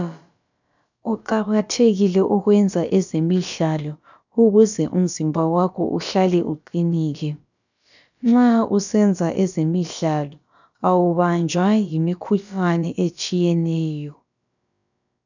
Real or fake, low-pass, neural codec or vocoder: fake; 7.2 kHz; codec, 16 kHz, about 1 kbps, DyCAST, with the encoder's durations